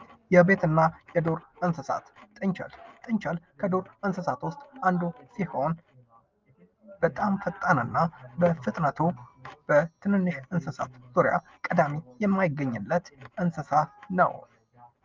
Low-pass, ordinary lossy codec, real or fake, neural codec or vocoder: 7.2 kHz; Opus, 24 kbps; real; none